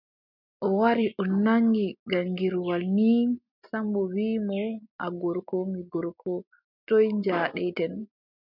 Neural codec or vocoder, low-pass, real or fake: none; 5.4 kHz; real